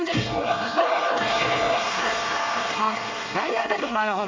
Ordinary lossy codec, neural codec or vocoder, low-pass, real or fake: MP3, 48 kbps; codec, 24 kHz, 1 kbps, SNAC; 7.2 kHz; fake